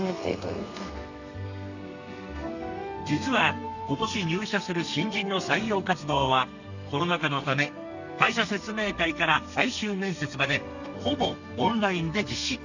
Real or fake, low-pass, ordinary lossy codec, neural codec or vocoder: fake; 7.2 kHz; none; codec, 32 kHz, 1.9 kbps, SNAC